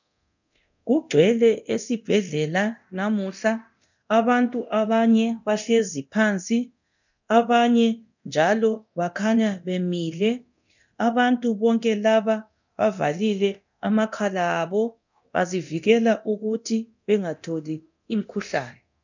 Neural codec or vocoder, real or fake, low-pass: codec, 24 kHz, 0.9 kbps, DualCodec; fake; 7.2 kHz